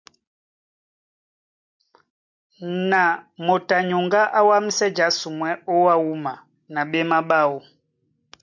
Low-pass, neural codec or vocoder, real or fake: 7.2 kHz; none; real